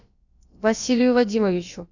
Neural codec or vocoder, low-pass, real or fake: codec, 16 kHz, about 1 kbps, DyCAST, with the encoder's durations; 7.2 kHz; fake